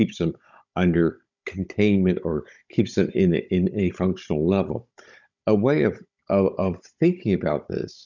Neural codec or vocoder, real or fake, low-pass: codec, 16 kHz, 16 kbps, FunCodec, trained on Chinese and English, 50 frames a second; fake; 7.2 kHz